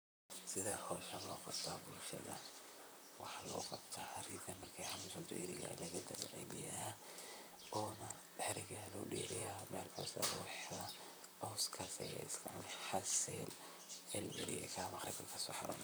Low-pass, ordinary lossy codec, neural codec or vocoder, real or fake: none; none; vocoder, 44.1 kHz, 128 mel bands, Pupu-Vocoder; fake